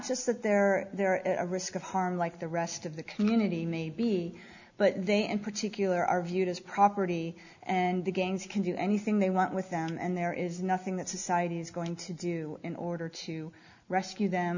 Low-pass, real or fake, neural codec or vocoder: 7.2 kHz; real; none